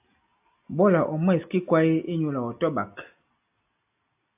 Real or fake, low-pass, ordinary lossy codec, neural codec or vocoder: real; 3.6 kHz; AAC, 32 kbps; none